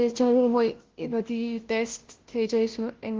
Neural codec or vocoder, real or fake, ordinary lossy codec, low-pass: codec, 16 kHz, 0.5 kbps, FunCodec, trained on Chinese and English, 25 frames a second; fake; Opus, 16 kbps; 7.2 kHz